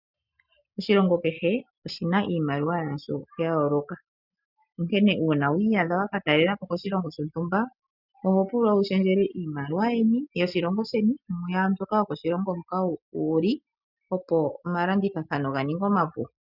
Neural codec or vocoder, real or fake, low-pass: none; real; 5.4 kHz